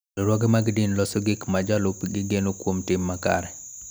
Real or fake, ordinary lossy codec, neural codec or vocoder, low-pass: real; none; none; none